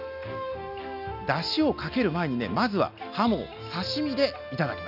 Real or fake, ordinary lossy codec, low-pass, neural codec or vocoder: real; none; 5.4 kHz; none